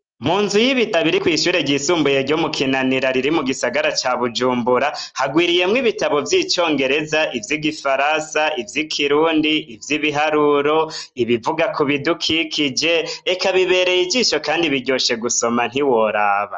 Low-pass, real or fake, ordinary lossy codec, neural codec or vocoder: 7.2 kHz; real; Opus, 24 kbps; none